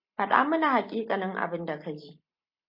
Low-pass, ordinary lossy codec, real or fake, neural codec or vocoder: 5.4 kHz; MP3, 32 kbps; real; none